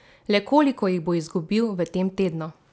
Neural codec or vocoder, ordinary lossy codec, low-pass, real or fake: none; none; none; real